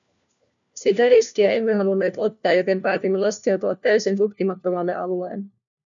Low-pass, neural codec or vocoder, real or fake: 7.2 kHz; codec, 16 kHz, 1 kbps, FunCodec, trained on LibriTTS, 50 frames a second; fake